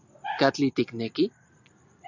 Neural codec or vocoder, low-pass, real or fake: none; 7.2 kHz; real